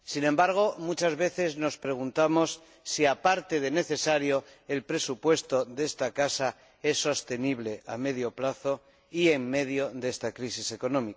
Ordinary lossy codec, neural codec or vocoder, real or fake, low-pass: none; none; real; none